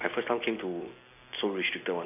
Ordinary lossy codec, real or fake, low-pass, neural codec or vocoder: AAC, 24 kbps; real; 3.6 kHz; none